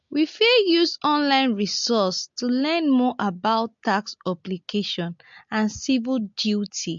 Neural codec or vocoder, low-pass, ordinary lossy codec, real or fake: none; 7.2 kHz; MP3, 48 kbps; real